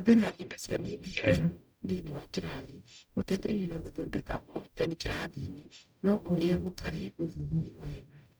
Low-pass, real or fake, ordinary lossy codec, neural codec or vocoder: none; fake; none; codec, 44.1 kHz, 0.9 kbps, DAC